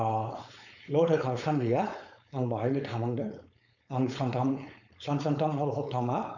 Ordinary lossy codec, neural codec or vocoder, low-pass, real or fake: none; codec, 16 kHz, 4.8 kbps, FACodec; 7.2 kHz; fake